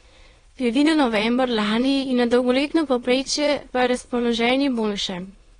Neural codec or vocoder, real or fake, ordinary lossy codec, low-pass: autoencoder, 22.05 kHz, a latent of 192 numbers a frame, VITS, trained on many speakers; fake; AAC, 32 kbps; 9.9 kHz